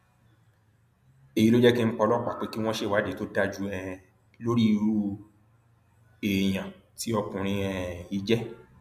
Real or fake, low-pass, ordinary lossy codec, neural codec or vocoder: real; 14.4 kHz; none; none